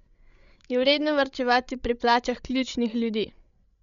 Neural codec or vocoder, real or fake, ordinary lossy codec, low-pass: codec, 16 kHz, 16 kbps, FreqCodec, larger model; fake; none; 7.2 kHz